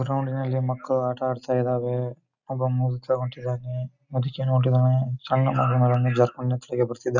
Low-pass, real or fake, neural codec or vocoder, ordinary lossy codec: 7.2 kHz; real; none; none